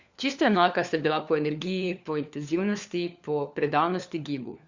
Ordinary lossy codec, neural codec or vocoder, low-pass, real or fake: Opus, 64 kbps; codec, 16 kHz, 4 kbps, FunCodec, trained on LibriTTS, 50 frames a second; 7.2 kHz; fake